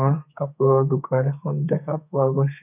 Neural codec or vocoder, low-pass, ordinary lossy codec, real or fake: codec, 32 kHz, 1.9 kbps, SNAC; 3.6 kHz; none; fake